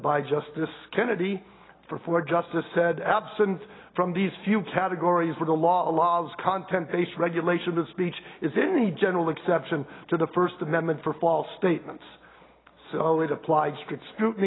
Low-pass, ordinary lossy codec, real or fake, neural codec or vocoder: 7.2 kHz; AAC, 16 kbps; real; none